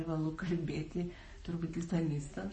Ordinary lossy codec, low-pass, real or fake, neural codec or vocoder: MP3, 32 kbps; 10.8 kHz; fake; codec, 44.1 kHz, 7.8 kbps, Pupu-Codec